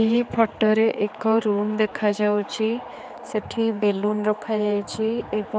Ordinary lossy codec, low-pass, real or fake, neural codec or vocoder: none; none; fake; codec, 16 kHz, 4 kbps, X-Codec, HuBERT features, trained on general audio